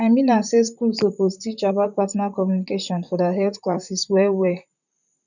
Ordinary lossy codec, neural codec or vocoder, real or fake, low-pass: none; vocoder, 44.1 kHz, 128 mel bands, Pupu-Vocoder; fake; 7.2 kHz